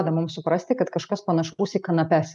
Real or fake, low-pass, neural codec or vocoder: real; 10.8 kHz; none